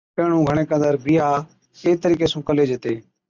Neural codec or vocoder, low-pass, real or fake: none; 7.2 kHz; real